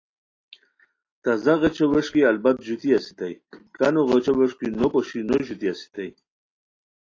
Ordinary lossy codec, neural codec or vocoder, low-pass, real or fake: AAC, 32 kbps; none; 7.2 kHz; real